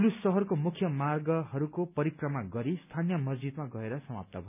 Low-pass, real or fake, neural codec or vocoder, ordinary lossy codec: 3.6 kHz; real; none; none